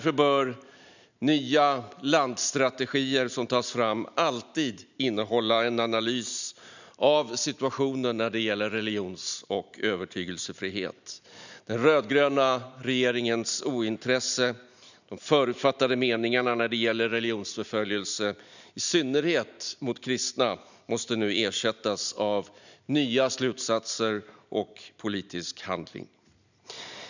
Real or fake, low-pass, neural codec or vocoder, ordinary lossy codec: real; 7.2 kHz; none; none